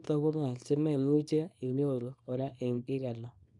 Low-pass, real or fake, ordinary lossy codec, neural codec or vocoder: 10.8 kHz; fake; none; codec, 24 kHz, 0.9 kbps, WavTokenizer, small release